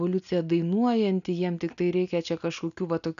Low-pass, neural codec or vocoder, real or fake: 7.2 kHz; none; real